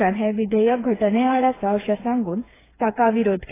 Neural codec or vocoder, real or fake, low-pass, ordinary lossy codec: codec, 16 kHz, 4 kbps, FreqCodec, smaller model; fake; 3.6 kHz; AAC, 16 kbps